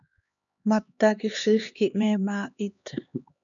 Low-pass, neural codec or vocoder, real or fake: 7.2 kHz; codec, 16 kHz, 2 kbps, X-Codec, HuBERT features, trained on LibriSpeech; fake